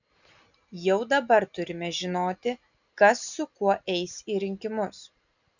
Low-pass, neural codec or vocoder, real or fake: 7.2 kHz; none; real